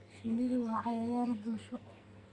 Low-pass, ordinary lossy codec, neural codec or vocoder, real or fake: 10.8 kHz; none; codec, 44.1 kHz, 2.6 kbps, SNAC; fake